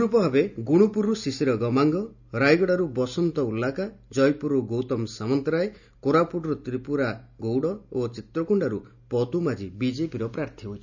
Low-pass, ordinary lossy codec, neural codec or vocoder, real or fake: 7.2 kHz; none; none; real